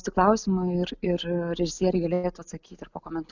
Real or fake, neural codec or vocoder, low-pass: real; none; 7.2 kHz